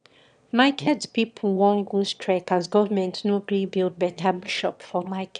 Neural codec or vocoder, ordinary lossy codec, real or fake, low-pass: autoencoder, 22.05 kHz, a latent of 192 numbers a frame, VITS, trained on one speaker; none; fake; 9.9 kHz